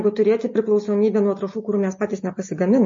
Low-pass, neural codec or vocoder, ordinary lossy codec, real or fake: 7.2 kHz; none; MP3, 32 kbps; real